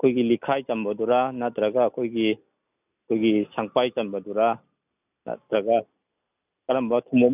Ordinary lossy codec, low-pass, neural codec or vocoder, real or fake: none; 3.6 kHz; none; real